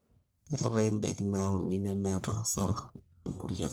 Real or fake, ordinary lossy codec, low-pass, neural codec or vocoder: fake; none; none; codec, 44.1 kHz, 1.7 kbps, Pupu-Codec